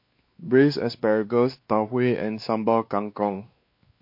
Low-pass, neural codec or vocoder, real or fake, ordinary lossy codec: 5.4 kHz; codec, 16 kHz, 2 kbps, X-Codec, WavLM features, trained on Multilingual LibriSpeech; fake; MP3, 32 kbps